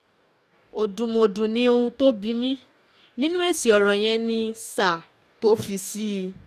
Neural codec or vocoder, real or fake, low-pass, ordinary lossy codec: codec, 44.1 kHz, 2.6 kbps, DAC; fake; 14.4 kHz; none